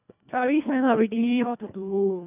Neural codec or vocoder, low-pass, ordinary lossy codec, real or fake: codec, 24 kHz, 1.5 kbps, HILCodec; 3.6 kHz; none; fake